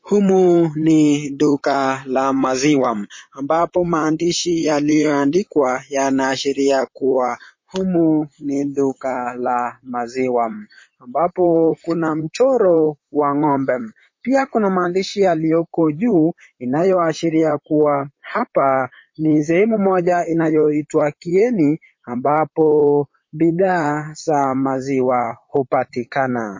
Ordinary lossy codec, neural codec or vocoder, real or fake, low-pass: MP3, 32 kbps; vocoder, 44.1 kHz, 128 mel bands, Pupu-Vocoder; fake; 7.2 kHz